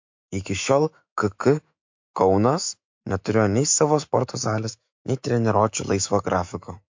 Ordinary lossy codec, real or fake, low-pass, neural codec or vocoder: MP3, 48 kbps; fake; 7.2 kHz; autoencoder, 48 kHz, 128 numbers a frame, DAC-VAE, trained on Japanese speech